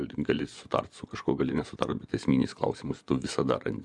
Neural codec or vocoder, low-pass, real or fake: vocoder, 24 kHz, 100 mel bands, Vocos; 10.8 kHz; fake